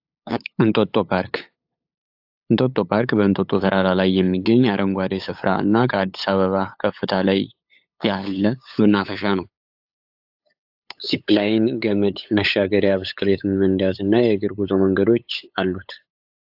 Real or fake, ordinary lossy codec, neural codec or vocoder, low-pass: fake; AAC, 48 kbps; codec, 16 kHz, 8 kbps, FunCodec, trained on LibriTTS, 25 frames a second; 5.4 kHz